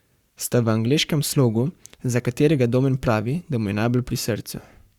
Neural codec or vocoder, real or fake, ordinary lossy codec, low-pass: codec, 44.1 kHz, 7.8 kbps, Pupu-Codec; fake; Opus, 64 kbps; 19.8 kHz